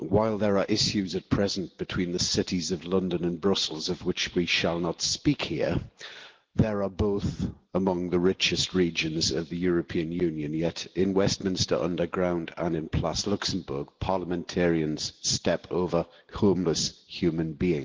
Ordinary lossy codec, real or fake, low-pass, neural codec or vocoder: Opus, 16 kbps; real; 7.2 kHz; none